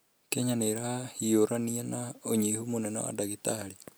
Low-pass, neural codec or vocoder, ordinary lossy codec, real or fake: none; none; none; real